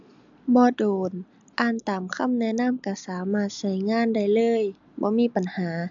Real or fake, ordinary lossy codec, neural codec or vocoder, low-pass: real; AAC, 64 kbps; none; 7.2 kHz